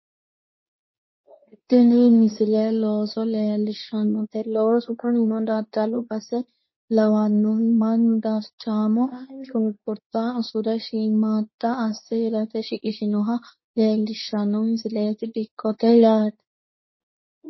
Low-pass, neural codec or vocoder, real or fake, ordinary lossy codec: 7.2 kHz; codec, 24 kHz, 0.9 kbps, WavTokenizer, medium speech release version 2; fake; MP3, 24 kbps